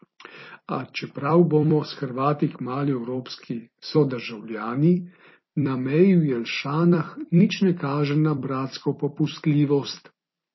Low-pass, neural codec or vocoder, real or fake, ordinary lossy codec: 7.2 kHz; vocoder, 44.1 kHz, 128 mel bands every 256 samples, BigVGAN v2; fake; MP3, 24 kbps